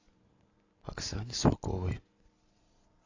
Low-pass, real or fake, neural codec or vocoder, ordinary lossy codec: 7.2 kHz; real; none; AAC, 48 kbps